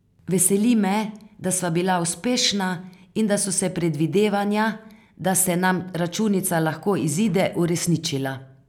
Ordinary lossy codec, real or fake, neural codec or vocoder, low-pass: none; real; none; 19.8 kHz